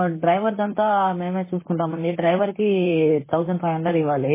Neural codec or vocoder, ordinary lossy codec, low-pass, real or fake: vocoder, 44.1 kHz, 128 mel bands, Pupu-Vocoder; MP3, 16 kbps; 3.6 kHz; fake